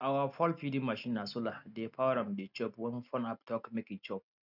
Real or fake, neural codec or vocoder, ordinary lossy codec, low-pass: real; none; none; 5.4 kHz